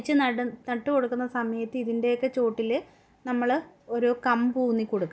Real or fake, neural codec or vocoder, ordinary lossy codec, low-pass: real; none; none; none